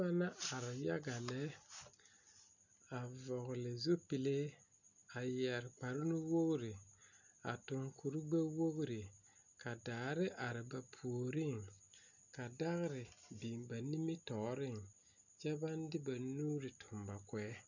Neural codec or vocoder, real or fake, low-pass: none; real; 7.2 kHz